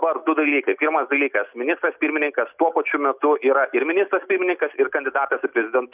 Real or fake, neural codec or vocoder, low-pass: real; none; 3.6 kHz